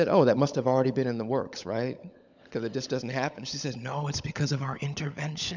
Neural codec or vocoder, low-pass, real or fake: codec, 16 kHz, 16 kbps, FunCodec, trained on LibriTTS, 50 frames a second; 7.2 kHz; fake